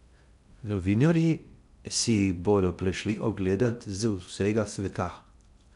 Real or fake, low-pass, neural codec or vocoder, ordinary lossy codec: fake; 10.8 kHz; codec, 16 kHz in and 24 kHz out, 0.6 kbps, FocalCodec, streaming, 2048 codes; none